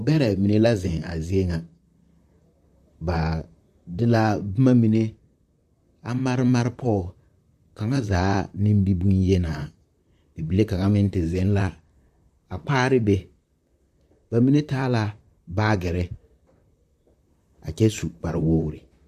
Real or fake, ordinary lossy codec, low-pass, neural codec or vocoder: fake; AAC, 96 kbps; 14.4 kHz; vocoder, 44.1 kHz, 128 mel bands, Pupu-Vocoder